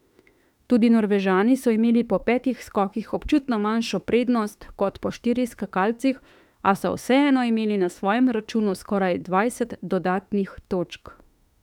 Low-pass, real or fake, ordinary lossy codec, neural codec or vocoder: 19.8 kHz; fake; none; autoencoder, 48 kHz, 32 numbers a frame, DAC-VAE, trained on Japanese speech